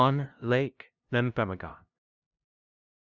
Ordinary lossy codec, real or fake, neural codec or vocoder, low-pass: Opus, 64 kbps; fake; codec, 16 kHz, 0.5 kbps, FunCodec, trained on LibriTTS, 25 frames a second; 7.2 kHz